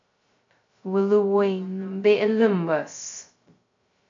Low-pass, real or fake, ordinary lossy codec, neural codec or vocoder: 7.2 kHz; fake; MP3, 48 kbps; codec, 16 kHz, 0.2 kbps, FocalCodec